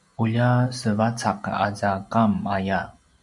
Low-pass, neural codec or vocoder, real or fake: 10.8 kHz; none; real